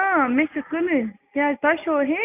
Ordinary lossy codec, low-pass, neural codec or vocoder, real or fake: none; 3.6 kHz; none; real